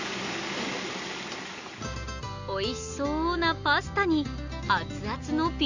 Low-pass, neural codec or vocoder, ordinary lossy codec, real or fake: 7.2 kHz; none; none; real